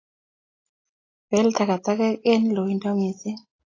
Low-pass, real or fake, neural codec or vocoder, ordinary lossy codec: 7.2 kHz; real; none; AAC, 32 kbps